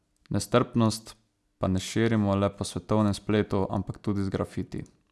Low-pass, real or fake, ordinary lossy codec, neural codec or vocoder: none; real; none; none